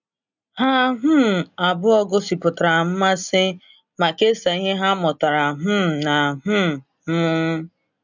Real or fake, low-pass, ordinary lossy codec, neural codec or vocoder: real; 7.2 kHz; none; none